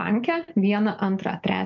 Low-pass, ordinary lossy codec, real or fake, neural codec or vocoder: 7.2 kHz; MP3, 64 kbps; real; none